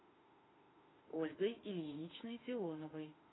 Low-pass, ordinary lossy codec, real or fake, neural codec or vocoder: 7.2 kHz; AAC, 16 kbps; fake; autoencoder, 48 kHz, 32 numbers a frame, DAC-VAE, trained on Japanese speech